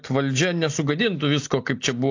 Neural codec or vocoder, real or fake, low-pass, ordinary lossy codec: none; real; 7.2 kHz; AAC, 48 kbps